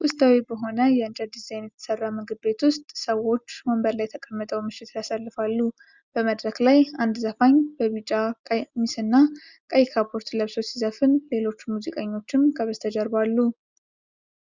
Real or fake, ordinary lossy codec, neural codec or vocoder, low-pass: real; Opus, 64 kbps; none; 7.2 kHz